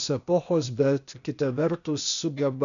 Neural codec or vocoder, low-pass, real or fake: codec, 16 kHz, 0.8 kbps, ZipCodec; 7.2 kHz; fake